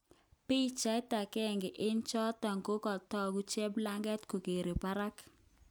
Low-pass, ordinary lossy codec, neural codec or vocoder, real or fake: none; none; none; real